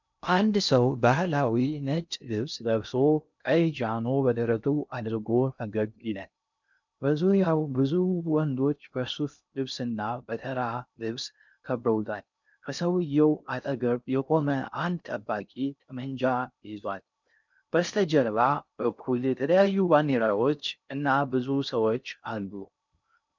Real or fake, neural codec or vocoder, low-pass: fake; codec, 16 kHz in and 24 kHz out, 0.6 kbps, FocalCodec, streaming, 2048 codes; 7.2 kHz